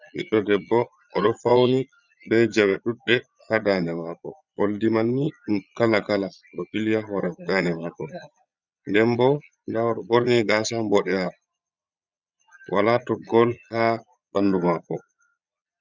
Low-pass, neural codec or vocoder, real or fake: 7.2 kHz; vocoder, 22.05 kHz, 80 mel bands, Vocos; fake